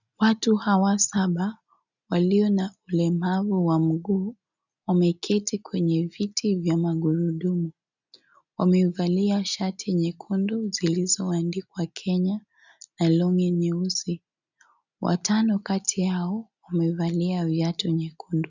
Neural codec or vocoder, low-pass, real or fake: none; 7.2 kHz; real